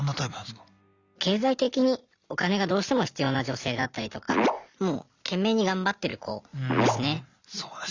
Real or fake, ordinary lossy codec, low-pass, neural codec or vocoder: real; Opus, 64 kbps; 7.2 kHz; none